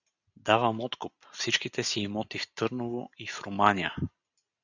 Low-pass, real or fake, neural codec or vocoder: 7.2 kHz; real; none